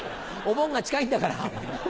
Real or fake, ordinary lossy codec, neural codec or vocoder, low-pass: real; none; none; none